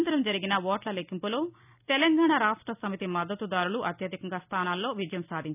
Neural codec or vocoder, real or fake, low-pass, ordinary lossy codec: none; real; 3.6 kHz; none